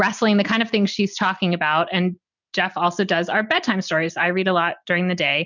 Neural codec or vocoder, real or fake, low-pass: none; real; 7.2 kHz